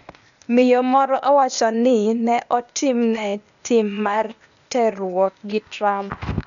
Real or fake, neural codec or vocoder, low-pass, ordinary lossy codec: fake; codec, 16 kHz, 0.8 kbps, ZipCodec; 7.2 kHz; none